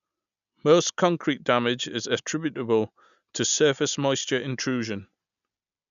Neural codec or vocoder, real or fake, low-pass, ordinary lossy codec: none; real; 7.2 kHz; none